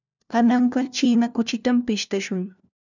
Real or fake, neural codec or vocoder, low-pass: fake; codec, 16 kHz, 1 kbps, FunCodec, trained on LibriTTS, 50 frames a second; 7.2 kHz